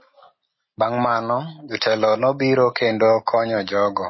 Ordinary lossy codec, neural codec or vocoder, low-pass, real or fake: MP3, 24 kbps; none; 7.2 kHz; real